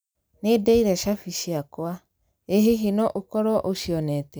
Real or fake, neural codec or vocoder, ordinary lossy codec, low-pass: real; none; none; none